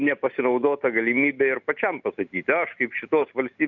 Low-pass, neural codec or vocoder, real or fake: 7.2 kHz; none; real